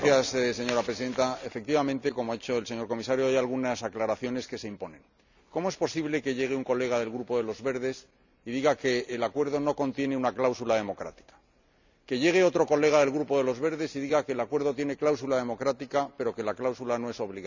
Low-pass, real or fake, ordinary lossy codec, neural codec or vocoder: 7.2 kHz; real; none; none